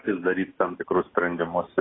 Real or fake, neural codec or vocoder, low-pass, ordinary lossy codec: real; none; 7.2 kHz; AAC, 16 kbps